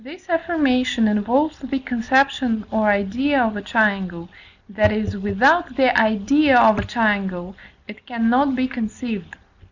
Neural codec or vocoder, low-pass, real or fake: none; 7.2 kHz; real